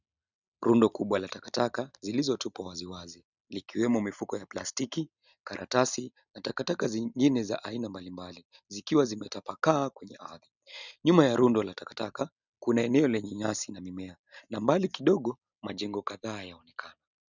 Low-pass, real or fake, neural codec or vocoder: 7.2 kHz; real; none